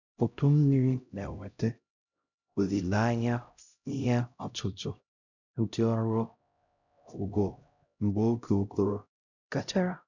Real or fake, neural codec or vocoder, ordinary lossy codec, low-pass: fake; codec, 16 kHz, 0.5 kbps, X-Codec, HuBERT features, trained on LibriSpeech; none; 7.2 kHz